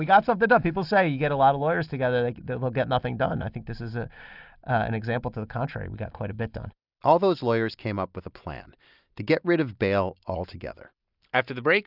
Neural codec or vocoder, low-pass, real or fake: none; 5.4 kHz; real